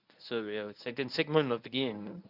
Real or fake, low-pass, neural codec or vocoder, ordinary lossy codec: fake; 5.4 kHz; codec, 24 kHz, 0.9 kbps, WavTokenizer, medium speech release version 1; none